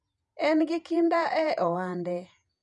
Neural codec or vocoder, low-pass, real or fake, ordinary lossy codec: vocoder, 48 kHz, 128 mel bands, Vocos; 10.8 kHz; fake; none